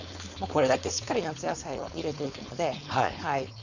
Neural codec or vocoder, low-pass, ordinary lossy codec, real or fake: codec, 16 kHz, 4.8 kbps, FACodec; 7.2 kHz; none; fake